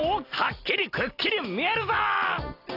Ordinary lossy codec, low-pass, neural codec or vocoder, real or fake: AAC, 32 kbps; 5.4 kHz; none; real